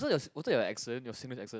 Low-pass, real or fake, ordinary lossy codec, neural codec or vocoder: none; real; none; none